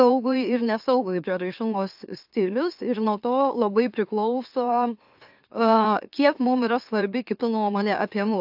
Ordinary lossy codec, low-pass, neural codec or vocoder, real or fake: AAC, 48 kbps; 5.4 kHz; autoencoder, 44.1 kHz, a latent of 192 numbers a frame, MeloTTS; fake